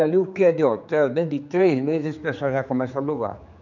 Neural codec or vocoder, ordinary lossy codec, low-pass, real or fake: codec, 16 kHz, 4 kbps, X-Codec, HuBERT features, trained on general audio; none; 7.2 kHz; fake